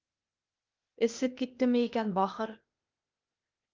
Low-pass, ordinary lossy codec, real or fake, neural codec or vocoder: 7.2 kHz; Opus, 24 kbps; fake; codec, 16 kHz, 0.8 kbps, ZipCodec